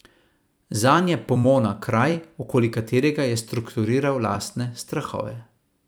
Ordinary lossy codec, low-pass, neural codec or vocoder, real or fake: none; none; vocoder, 44.1 kHz, 128 mel bands every 256 samples, BigVGAN v2; fake